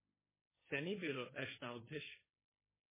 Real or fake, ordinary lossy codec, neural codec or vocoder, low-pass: fake; MP3, 16 kbps; codec, 16 kHz, 1.1 kbps, Voila-Tokenizer; 3.6 kHz